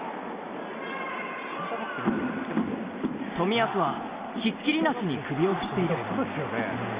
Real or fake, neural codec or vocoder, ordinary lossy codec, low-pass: real; none; Opus, 64 kbps; 3.6 kHz